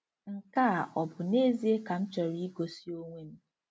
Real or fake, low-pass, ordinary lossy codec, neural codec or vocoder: real; none; none; none